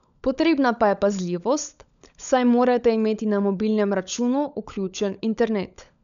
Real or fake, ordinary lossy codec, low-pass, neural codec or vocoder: fake; none; 7.2 kHz; codec, 16 kHz, 16 kbps, FunCodec, trained on LibriTTS, 50 frames a second